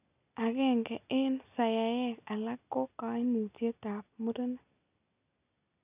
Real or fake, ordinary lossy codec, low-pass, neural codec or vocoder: real; none; 3.6 kHz; none